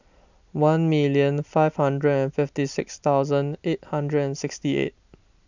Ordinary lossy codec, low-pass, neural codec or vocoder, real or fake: none; 7.2 kHz; none; real